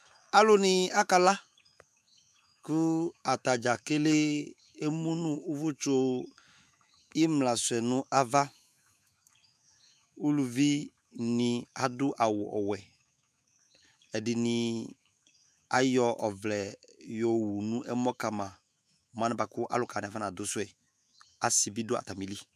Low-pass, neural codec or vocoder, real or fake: 14.4 kHz; autoencoder, 48 kHz, 128 numbers a frame, DAC-VAE, trained on Japanese speech; fake